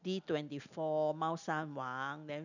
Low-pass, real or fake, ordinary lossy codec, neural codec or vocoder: 7.2 kHz; real; none; none